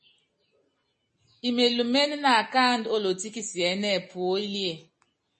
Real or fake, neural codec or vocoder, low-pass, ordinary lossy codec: real; none; 10.8 kHz; MP3, 32 kbps